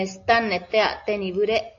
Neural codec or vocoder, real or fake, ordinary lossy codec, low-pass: none; real; AAC, 48 kbps; 7.2 kHz